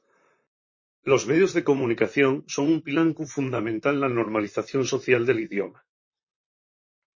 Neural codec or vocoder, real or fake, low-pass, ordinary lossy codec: vocoder, 44.1 kHz, 128 mel bands, Pupu-Vocoder; fake; 7.2 kHz; MP3, 32 kbps